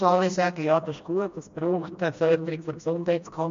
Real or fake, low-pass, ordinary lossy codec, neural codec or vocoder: fake; 7.2 kHz; MP3, 48 kbps; codec, 16 kHz, 1 kbps, FreqCodec, smaller model